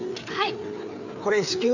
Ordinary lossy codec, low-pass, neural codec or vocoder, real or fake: none; 7.2 kHz; codec, 16 kHz, 4 kbps, FreqCodec, larger model; fake